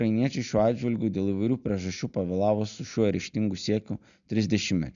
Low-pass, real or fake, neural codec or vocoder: 7.2 kHz; real; none